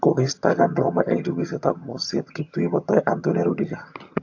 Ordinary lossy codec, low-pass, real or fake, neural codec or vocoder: AAC, 48 kbps; 7.2 kHz; fake; vocoder, 22.05 kHz, 80 mel bands, HiFi-GAN